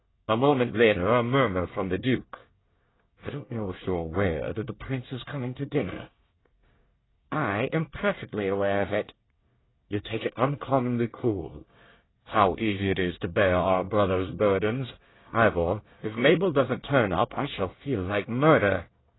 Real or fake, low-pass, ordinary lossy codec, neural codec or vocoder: fake; 7.2 kHz; AAC, 16 kbps; codec, 24 kHz, 1 kbps, SNAC